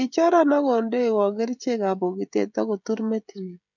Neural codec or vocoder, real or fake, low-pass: codec, 16 kHz, 16 kbps, FreqCodec, smaller model; fake; 7.2 kHz